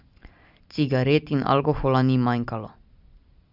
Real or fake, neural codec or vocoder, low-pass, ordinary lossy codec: real; none; 5.4 kHz; Opus, 64 kbps